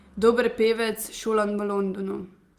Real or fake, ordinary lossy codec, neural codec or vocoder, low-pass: real; Opus, 24 kbps; none; 14.4 kHz